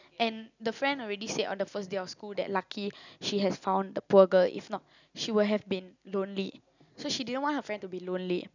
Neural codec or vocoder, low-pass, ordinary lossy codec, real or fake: none; 7.2 kHz; none; real